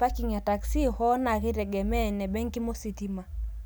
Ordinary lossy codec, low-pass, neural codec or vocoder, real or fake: none; none; none; real